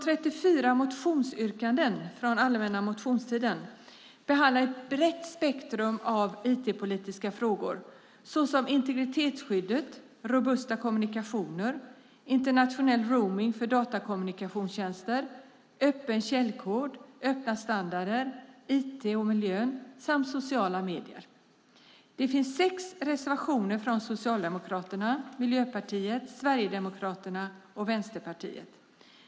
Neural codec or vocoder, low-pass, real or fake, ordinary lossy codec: none; none; real; none